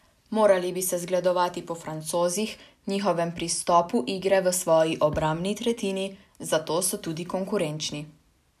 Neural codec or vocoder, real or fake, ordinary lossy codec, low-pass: none; real; none; 14.4 kHz